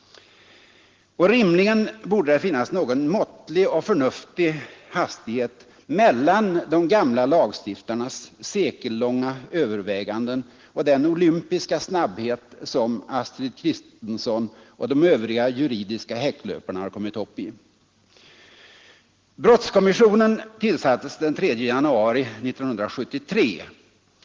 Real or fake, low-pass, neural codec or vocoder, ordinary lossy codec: real; 7.2 kHz; none; Opus, 16 kbps